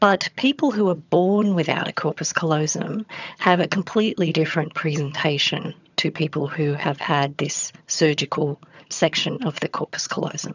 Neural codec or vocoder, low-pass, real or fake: vocoder, 22.05 kHz, 80 mel bands, HiFi-GAN; 7.2 kHz; fake